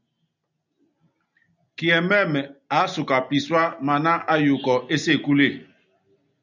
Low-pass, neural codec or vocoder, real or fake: 7.2 kHz; none; real